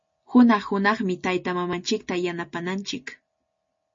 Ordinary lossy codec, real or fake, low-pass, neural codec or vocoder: MP3, 32 kbps; real; 7.2 kHz; none